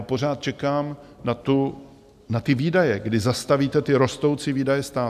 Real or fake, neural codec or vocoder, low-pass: real; none; 14.4 kHz